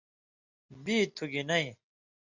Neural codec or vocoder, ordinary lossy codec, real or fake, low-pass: none; Opus, 64 kbps; real; 7.2 kHz